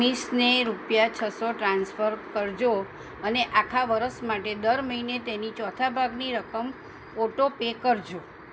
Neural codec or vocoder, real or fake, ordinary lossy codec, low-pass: none; real; none; none